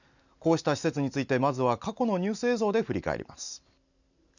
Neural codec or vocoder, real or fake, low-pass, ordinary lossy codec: none; real; 7.2 kHz; none